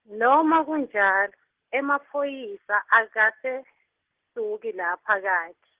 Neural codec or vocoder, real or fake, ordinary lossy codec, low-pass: none; real; Opus, 16 kbps; 3.6 kHz